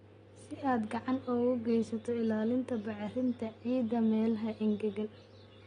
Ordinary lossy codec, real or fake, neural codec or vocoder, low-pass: AAC, 32 kbps; real; none; 9.9 kHz